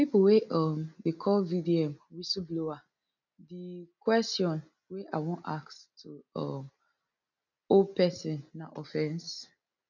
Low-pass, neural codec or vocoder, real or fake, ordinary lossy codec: 7.2 kHz; none; real; none